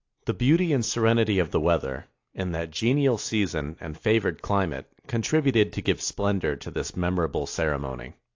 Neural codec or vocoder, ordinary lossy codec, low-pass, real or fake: none; AAC, 48 kbps; 7.2 kHz; real